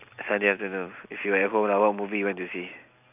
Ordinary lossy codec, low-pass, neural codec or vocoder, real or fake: none; 3.6 kHz; none; real